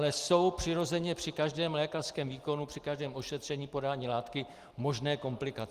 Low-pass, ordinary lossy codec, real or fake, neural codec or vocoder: 14.4 kHz; Opus, 24 kbps; real; none